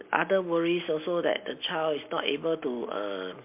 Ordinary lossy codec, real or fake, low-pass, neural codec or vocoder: MP3, 32 kbps; real; 3.6 kHz; none